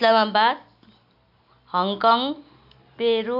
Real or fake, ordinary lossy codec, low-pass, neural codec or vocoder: real; none; 5.4 kHz; none